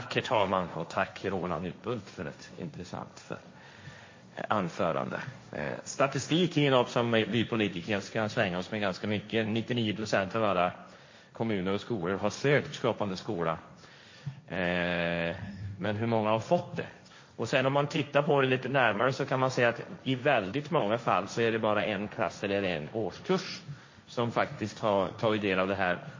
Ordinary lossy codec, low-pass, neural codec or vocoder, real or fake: MP3, 32 kbps; 7.2 kHz; codec, 16 kHz, 1.1 kbps, Voila-Tokenizer; fake